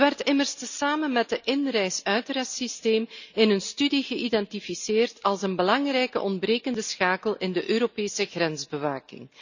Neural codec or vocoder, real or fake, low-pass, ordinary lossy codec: none; real; 7.2 kHz; none